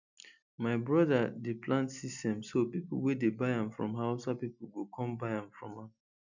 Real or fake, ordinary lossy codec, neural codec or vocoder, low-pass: real; none; none; 7.2 kHz